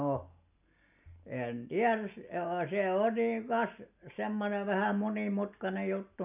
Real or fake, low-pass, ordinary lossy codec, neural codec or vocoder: real; 3.6 kHz; none; none